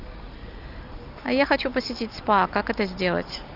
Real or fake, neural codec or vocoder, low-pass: real; none; 5.4 kHz